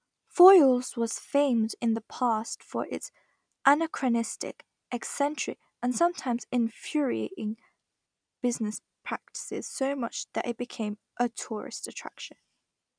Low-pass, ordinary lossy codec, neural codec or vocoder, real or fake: 9.9 kHz; none; none; real